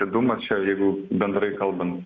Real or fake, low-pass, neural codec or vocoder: real; 7.2 kHz; none